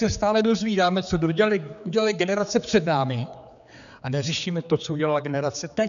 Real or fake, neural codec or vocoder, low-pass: fake; codec, 16 kHz, 4 kbps, X-Codec, HuBERT features, trained on general audio; 7.2 kHz